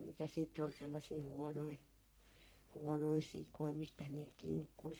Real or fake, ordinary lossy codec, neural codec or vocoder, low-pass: fake; none; codec, 44.1 kHz, 1.7 kbps, Pupu-Codec; none